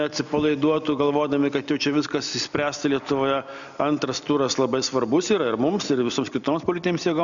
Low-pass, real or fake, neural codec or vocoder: 7.2 kHz; real; none